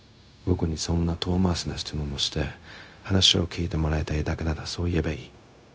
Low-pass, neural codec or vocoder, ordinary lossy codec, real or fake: none; codec, 16 kHz, 0.4 kbps, LongCat-Audio-Codec; none; fake